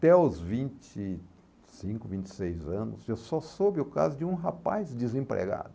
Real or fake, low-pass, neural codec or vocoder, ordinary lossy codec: real; none; none; none